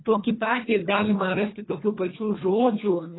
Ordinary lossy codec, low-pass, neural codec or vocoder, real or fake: AAC, 16 kbps; 7.2 kHz; codec, 24 kHz, 1.5 kbps, HILCodec; fake